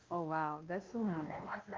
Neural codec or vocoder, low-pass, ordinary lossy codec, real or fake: codec, 16 kHz, 2 kbps, X-Codec, WavLM features, trained on Multilingual LibriSpeech; 7.2 kHz; Opus, 24 kbps; fake